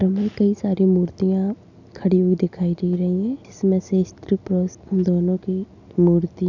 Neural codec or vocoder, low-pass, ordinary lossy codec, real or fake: none; 7.2 kHz; none; real